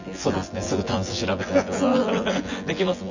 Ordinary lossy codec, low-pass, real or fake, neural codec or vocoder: Opus, 64 kbps; 7.2 kHz; fake; vocoder, 24 kHz, 100 mel bands, Vocos